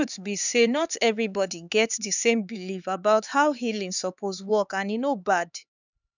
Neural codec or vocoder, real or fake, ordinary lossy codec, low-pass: codec, 16 kHz, 4 kbps, X-Codec, HuBERT features, trained on LibriSpeech; fake; none; 7.2 kHz